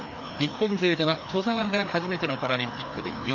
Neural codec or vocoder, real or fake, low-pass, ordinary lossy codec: codec, 16 kHz, 2 kbps, FreqCodec, larger model; fake; 7.2 kHz; none